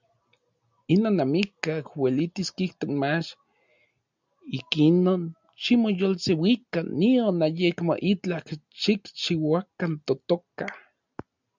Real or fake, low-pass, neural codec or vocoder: real; 7.2 kHz; none